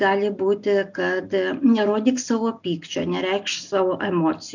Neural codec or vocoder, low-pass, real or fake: none; 7.2 kHz; real